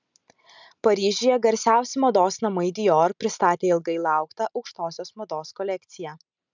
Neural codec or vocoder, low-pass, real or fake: none; 7.2 kHz; real